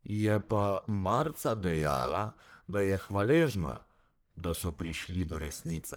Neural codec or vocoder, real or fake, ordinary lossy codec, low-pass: codec, 44.1 kHz, 1.7 kbps, Pupu-Codec; fake; none; none